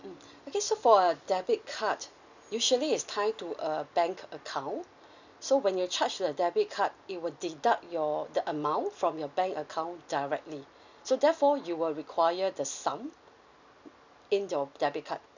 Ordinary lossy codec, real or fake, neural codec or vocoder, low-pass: none; real; none; 7.2 kHz